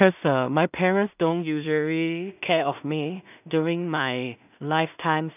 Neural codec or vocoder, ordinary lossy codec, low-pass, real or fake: codec, 16 kHz in and 24 kHz out, 0.4 kbps, LongCat-Audio-Codec, two codebook decoder; none; 3.6 kHz; fake